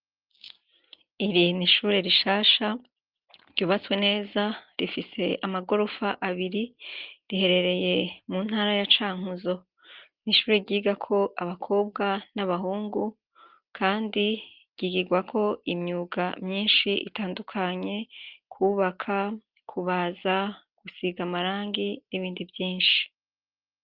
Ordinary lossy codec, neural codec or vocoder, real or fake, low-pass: Opus, 32 kbps; none; real; 5.4 kHz